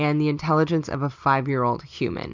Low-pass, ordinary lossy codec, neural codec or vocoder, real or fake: 7.2 kHz; MP3, 64 kbps; none; real